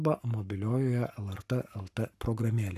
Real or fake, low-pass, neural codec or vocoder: fake; 14.4 kHz; codec, 44.1 kHz, 7.8 kbps, Pupu-Codec